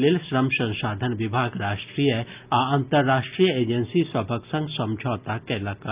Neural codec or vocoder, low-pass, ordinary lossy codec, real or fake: none; 3.6 kHz; Opus, 24 kbps; real